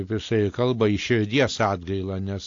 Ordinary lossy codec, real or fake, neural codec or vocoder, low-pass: AAC, 48 kbps; real; none; 7.2 kHz